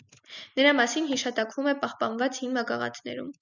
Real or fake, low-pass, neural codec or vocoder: real; 7.2 kHz; none